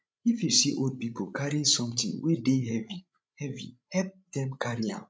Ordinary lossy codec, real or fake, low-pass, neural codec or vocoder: none; fake; none; codec, 16 kHz, 16 kbps, FreqCodec, larger model